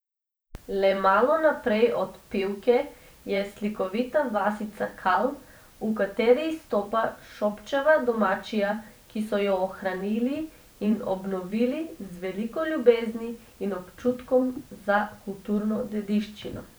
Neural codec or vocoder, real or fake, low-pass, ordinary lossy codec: vocoder, 44.1 kHz, 128 mel bands every 512 samples, BigVGAN v2; fake; none; none